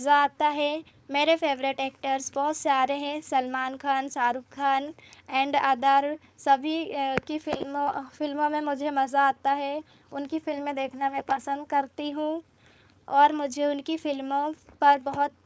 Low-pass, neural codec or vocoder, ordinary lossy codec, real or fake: none; codec, 16 kHz, 4.8 kbps, FACodec; none; fake